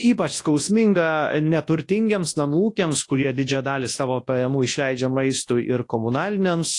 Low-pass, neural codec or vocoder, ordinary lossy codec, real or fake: 10.8 kHz; codec, 24 kHz, 0.9 kbps, WavTokenizer, large speech release; AAC, 48 kbps; fake